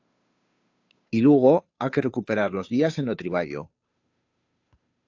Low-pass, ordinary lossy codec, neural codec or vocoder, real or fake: 7.2 kHz; AAC, 48 kbps; codec, 16 kHz, 2 kbps, FunCodec, trained on Chinese and English, 25 frames a second; fake